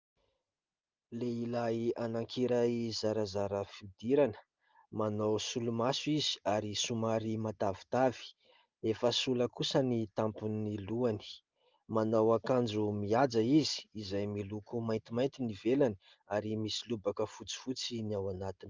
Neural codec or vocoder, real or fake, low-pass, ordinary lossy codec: none; real; 7.2 kHz; Opus, 32 kbps